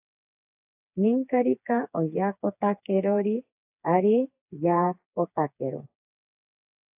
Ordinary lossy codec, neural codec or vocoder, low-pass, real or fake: AAC, 32 kbps; codec, 16 kHz, 4 kbps, FreqCodec, smaller model; 3.6 kHz; fake